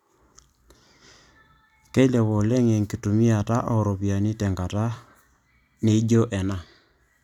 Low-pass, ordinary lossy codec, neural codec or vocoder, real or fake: 19.8 kHz; none; vocoder, 44.1 kHz, 128 mel bands every 256 samples, BigVGAN v2; fake